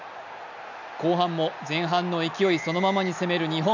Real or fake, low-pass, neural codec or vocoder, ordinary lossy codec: real; 7.2 kHz; none; none